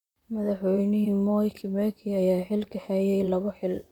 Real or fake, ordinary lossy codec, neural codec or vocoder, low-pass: fake; none; vocoder, 44.1 kHz, 128 mel bands, Pupu-Vocoder; 19.8 kHz